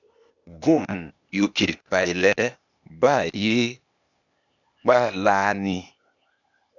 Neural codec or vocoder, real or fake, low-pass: codec, 16 kHz, 0.8 kbps, ZipCodec; fake; 7.2 kHz